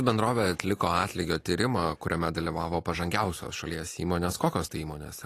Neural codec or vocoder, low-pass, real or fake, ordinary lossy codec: none; 14.4 kHz; real; AAC, 48 kbps